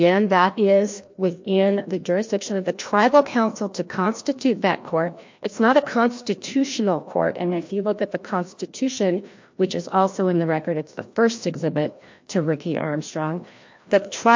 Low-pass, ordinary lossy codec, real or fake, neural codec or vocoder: 7.2 kHz; MP3, 48 kbps; fake; codec, 16 kHz, 1 kbps, FreqCodec, larger model